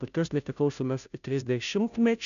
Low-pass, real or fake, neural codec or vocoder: 7.2 kHz; fake; codec, 16 kHz, 0.5 kbps, FunCodec, trained on Chinese and English, 25 frames a second